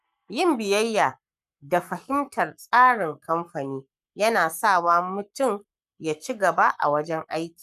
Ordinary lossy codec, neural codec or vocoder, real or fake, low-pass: none; codec, 44.1 kHz, 7.8 kbps, Pupu-Codec; fake; 14.4 kHz